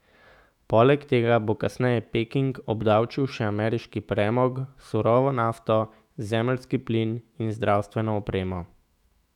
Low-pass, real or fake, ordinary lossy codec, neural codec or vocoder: 19.8 kHz; fake; none; autoencoder, 48 kHz, 128 numbers a frame, DAC-VAE, trained on Japanese speech